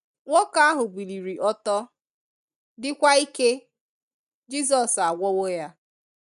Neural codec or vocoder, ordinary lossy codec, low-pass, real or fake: none; none; 10.8 kHz; real